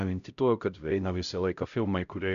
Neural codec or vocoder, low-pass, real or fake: codec, 16 kHz, 0.5 kbps, X-Codec, HuBERT features, trained on LibriSpeech; 7.2 kHz; fake